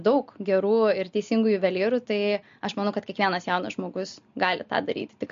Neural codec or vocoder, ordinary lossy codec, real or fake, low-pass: none; MP3, 48 kbps; real; 7.2 kHz